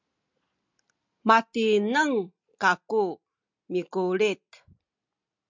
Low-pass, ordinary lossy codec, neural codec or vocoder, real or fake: 7.2 kHz; MP3, 64 kbps; none; real